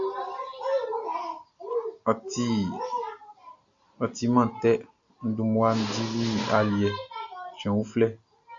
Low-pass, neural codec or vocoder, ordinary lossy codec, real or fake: 7.2 kHz; none; MP3, 64 kbps; real